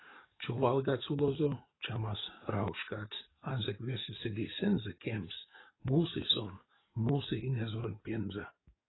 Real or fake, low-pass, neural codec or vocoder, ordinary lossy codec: fake; 7.2 kHz; codec, 44.1 kHz, 7.8 kbps, DAC; AAC, 16 kbps